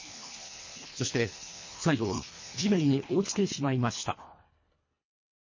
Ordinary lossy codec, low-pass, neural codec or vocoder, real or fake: MP3, 32 kbps; 7.2 kHz; codec, 24 kHz, 1.5 kbps, HILCodec; fake